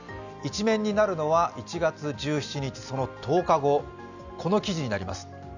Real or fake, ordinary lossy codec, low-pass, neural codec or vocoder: real; none; 7.2 kHz; none